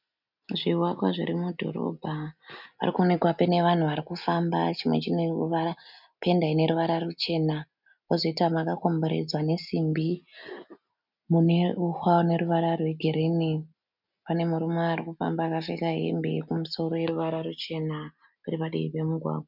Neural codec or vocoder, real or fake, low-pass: none; real; 5.4 kHz